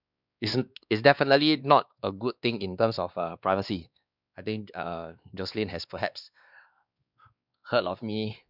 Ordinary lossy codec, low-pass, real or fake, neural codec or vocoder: none; 5.4 kHz; fake; codec, 16 kHz, 2 kbps, X-Codec, WavLM features, trained on Multilingual LibriSpeech